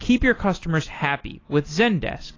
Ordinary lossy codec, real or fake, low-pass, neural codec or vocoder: AAC, 32 kbps; real; 7.2 kHz; none